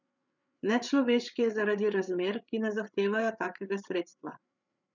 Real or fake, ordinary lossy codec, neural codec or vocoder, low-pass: fake; none; codec, 16 kHz, 8 kbps, FreqCodec, larger model; 7.2 kHz